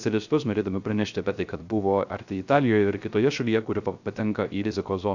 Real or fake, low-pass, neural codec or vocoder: fake; 7.2 kHz; codec, 16 kHz, 0.3 kbps, FocalCodec